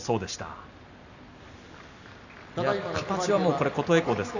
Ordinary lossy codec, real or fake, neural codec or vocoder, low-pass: none; real; none; 7.2 kHz